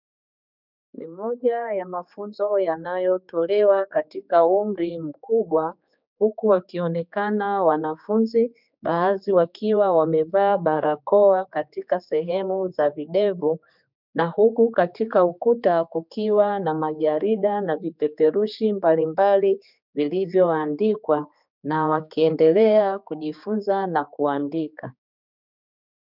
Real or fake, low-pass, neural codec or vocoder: fake; 5.4 kHz; codec, 16 kHz, 4 kbps, X-Codec, HuBERT features, trained on general audio